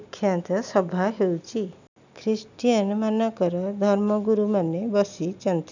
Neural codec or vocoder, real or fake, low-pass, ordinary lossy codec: none; real; 7.2 kHz; none